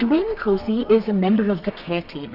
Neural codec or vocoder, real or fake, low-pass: codec, 32 kHz, 1.9 kbps, SNAC; fake; 5.4 kHz